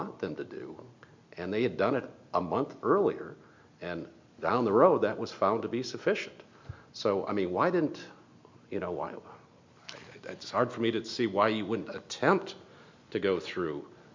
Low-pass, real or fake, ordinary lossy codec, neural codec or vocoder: 7.2 kHz; real; MP3, 64 kbps; none